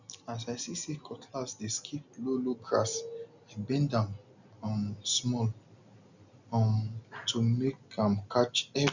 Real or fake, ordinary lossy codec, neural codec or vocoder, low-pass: real; none; none; 7.2 kHz